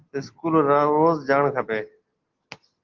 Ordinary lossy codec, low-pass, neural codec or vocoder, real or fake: Opus, 16 kbps; 7.2 kHz; none; real